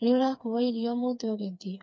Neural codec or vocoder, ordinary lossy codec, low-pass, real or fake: codec, 16 kHz, 4 kbps, FreqCodec, smaller model; none; none; fake